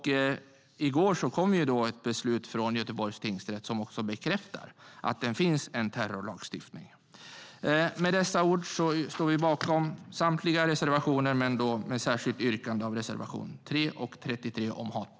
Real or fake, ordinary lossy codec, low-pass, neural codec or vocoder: real; none; none; none